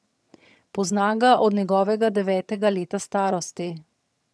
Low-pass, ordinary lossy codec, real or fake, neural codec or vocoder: none; none; fake; vocoder, 22.05 kHz, 80 mel bands, HiFi-GAN